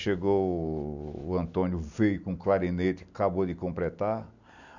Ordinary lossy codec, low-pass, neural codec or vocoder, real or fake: none; 7.2 kHz; none; real